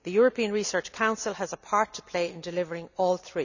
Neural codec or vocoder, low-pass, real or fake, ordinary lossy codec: none; 7.2 kHz; real; none